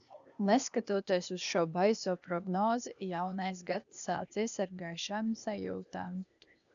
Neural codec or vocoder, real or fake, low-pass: codec, 16 kHz, 0.8 kbps, ZipCodec; fake; 7.2 kHz